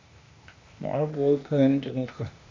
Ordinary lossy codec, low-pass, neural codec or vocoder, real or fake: MP3, 48 kbps; 7.2 kHz; codec, 16 kHz, 0.8 kbps, ZipCodec; fake